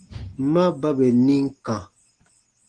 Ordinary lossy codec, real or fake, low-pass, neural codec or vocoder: Opus, 16 kbps; real; 9.9 kHz; none